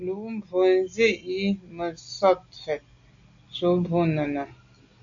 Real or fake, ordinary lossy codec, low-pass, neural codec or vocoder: real; AAC, 64 kbps; 7.2 kHz; none